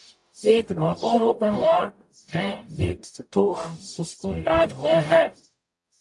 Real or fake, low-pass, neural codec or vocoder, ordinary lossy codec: fake; 10.8 kHz; codec, 44.1 kHz, 0.9 kbps, DAC; AAC, 64 kbps